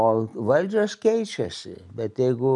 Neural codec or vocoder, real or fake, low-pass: none; real; 10.8 kHz